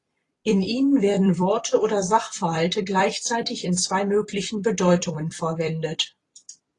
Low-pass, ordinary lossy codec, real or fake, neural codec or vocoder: 10.8 kHz; AAC, 32 kbps; fake; vocoder, 44.1 kHz, 128 mel bands every 256 samples, BigVGAN v2